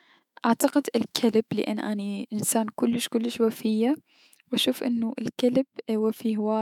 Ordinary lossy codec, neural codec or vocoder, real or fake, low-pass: none; autoencoder, 48 kHz, 128 numbers a frame, DAC-VAE, trained on Japanese speech; fake; 19.8 kHz